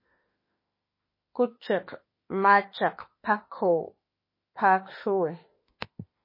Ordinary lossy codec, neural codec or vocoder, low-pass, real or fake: MP3, 24 kbps; autoencoder, 48 kHz, 32 numbers a frame, DAC-VAE, trained on Japanese speech; 5.4 kHz; fake